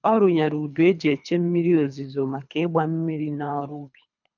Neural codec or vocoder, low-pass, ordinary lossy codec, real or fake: codec, 24 kHz, 3 kbps, HILCodec; 7.2 kHz; none; fake